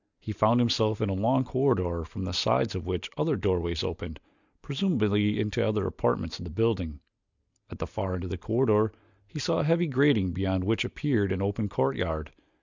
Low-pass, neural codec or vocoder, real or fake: 7.2 kHz; none; real